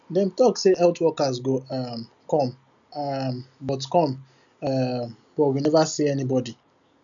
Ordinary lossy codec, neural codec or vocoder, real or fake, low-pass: none; none; real; 7.2 kHz